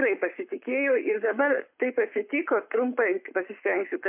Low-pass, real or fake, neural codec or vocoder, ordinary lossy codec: 3.6 kHz; fake; codec, 44.1 kHz, 7.8 kbps, Pupu-Codec; MP3, 32 kbps